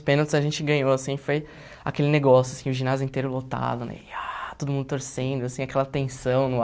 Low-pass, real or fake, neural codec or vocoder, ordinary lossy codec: none; real; none; none